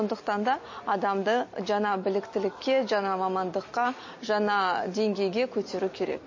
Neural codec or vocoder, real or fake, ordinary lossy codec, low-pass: none; real; MP3, 32 kbps; 7.2 kHz